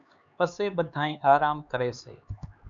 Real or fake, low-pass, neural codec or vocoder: fake; 7.2 kHz; codec, 16 kHz, 4 kbps, X-Codec, HuBERT features, trained on LibriSpeech